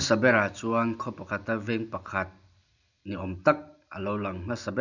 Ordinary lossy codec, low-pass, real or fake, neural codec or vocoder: none; 7.2 kHz; real; none